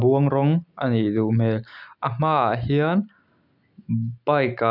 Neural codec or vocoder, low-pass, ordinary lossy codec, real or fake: none; 5.4 kHz; none; real